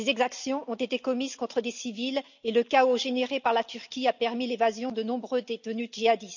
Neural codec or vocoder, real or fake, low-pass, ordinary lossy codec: none; real; 7.2 kHz; none